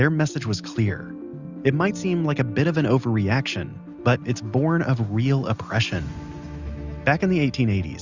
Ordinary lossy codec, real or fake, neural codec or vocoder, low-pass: Opus, 64 kbps; real; none; 7.2 kHz